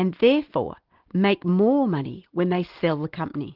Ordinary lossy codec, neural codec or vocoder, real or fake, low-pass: Opus, 16 kbps; none; real; 5.4 kHz